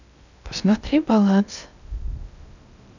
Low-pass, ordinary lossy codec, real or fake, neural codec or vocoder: 7.2 kHz; none; fake; codec, 16 kHz in and 24 kHz out, 0.6 kbps, FocalCodec, streaming, 2048 codes